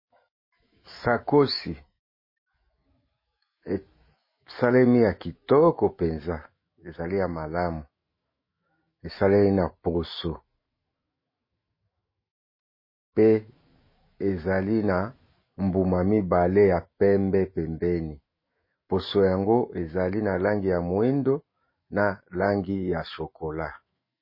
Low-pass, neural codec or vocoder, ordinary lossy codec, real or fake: 5.4 kHz; autoencoder, 48 kHz, 128 numbers a frame, DAC-VAE, trained on Japanese speech; MP3, 24 kbps; fake